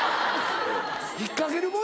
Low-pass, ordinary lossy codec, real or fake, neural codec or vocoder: none; none; real; none